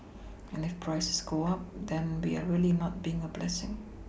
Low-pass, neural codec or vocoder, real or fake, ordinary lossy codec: none; none; real; none